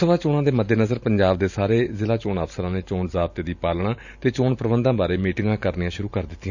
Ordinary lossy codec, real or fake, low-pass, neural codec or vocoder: none; fake; 7.2 kHz; vocoder, 44.1 kHz, 128 mel bands every 512 samples, BigVGAN v2